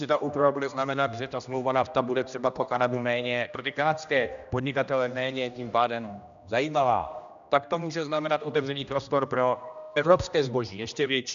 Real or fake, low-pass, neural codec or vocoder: fake; 7.2 kHz; codec, 16 kHz, 1 kbps, X-Codec, HuBERT features, trained on general audio